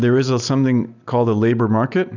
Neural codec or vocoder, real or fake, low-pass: none; real; 7.2 kHz